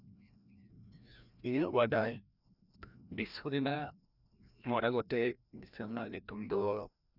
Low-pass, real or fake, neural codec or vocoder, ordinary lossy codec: 5.4 kHz; fake; codec, 16 kHz, 1 kbps, FreqCodec, larger model; none